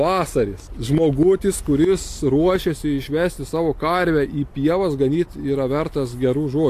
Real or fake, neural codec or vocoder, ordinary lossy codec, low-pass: real; none; AAC, 64 kbps; 14.4 kHz